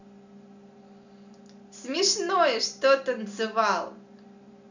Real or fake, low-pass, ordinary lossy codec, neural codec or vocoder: real; 7.2 kHz; none; none